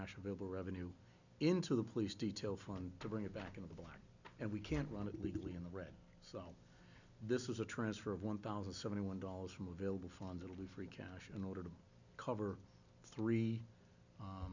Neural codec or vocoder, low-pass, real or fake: none; 7.2 kHz; real